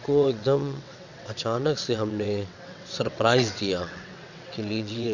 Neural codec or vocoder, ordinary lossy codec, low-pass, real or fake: vocoder, 22.05 kHz, 80 mel bands, WaveNeXt; none; 7.2 kHz; fake